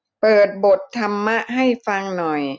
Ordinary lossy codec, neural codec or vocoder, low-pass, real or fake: none; none; none; real